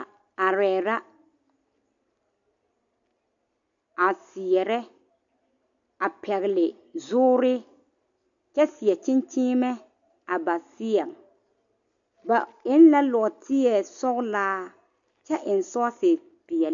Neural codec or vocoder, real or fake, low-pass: none; real; 7.2 kHz